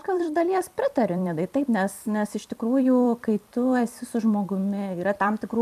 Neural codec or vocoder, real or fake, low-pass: vocoder, 44.1 kHz, 128 mel bands every 256 samples, BigVGAN v2; fake; 14.4 kHz